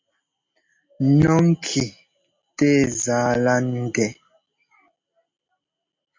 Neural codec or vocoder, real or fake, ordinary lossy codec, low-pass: none; real; MP3, 48 kbps; 7.2 kHz